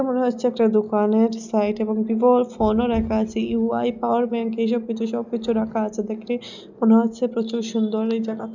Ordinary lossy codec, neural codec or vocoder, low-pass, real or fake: none; none; 7.2 kHz; real